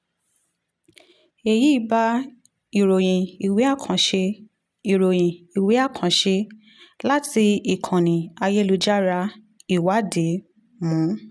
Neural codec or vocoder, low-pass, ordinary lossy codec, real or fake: none; none; none; real